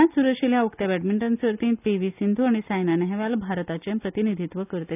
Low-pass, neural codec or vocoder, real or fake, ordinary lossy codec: 3.6 kHz; none; real; none